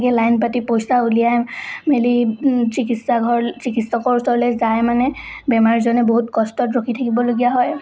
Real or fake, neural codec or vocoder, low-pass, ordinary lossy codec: real; none; none; none